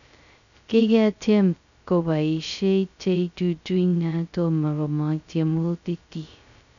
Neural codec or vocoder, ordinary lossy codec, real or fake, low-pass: codec, 16 kHz, 0.2 kbps, FocalCodec; none; fake; 7.2 kHz